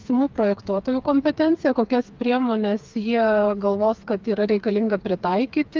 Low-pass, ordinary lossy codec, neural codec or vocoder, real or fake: 7.2 kHz; Opus, 24 kbps; codec, 16 kHz, 4 kbps, FreqCodec, smaller model; fake